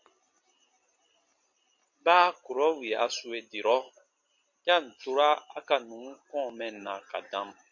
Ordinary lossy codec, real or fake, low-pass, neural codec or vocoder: MP3, 48 kbps; real; 7.2 kHz; none